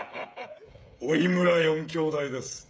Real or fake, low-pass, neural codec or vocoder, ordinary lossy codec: fake; none; codec, 16 kHz, 16 kbps, FreqCodec, smaller model; none